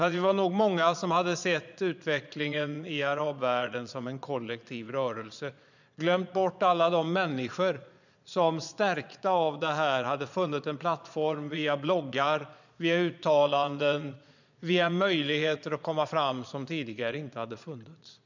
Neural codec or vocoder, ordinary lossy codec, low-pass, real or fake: vocoder, 22.05 kHz, 80 mel bands, Vocos; none; 7.2 kHz; fake